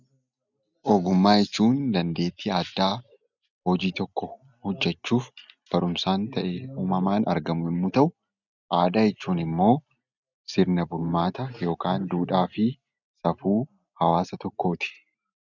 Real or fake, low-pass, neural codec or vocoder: real; 7.2 kHz; none